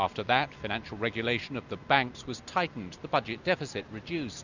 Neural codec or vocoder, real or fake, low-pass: none; real; 7.2 kHz